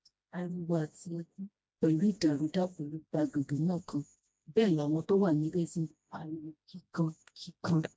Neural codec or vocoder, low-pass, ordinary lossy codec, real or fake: codec, 16 kHz, 1 kbps, FreqCodec, smaller model; none; none; fake